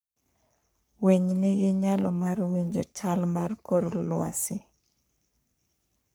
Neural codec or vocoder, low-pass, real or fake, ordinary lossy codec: codec, 44.1 kHz, 3.4 kbps, Pupu-Codec; none; fake; none